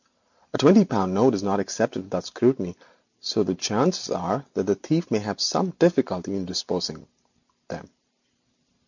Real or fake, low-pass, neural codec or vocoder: real; 7.2 kHz; none